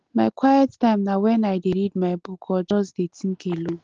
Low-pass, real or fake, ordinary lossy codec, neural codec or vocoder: 7.2 kHz; real; Opus, 16 kbps; none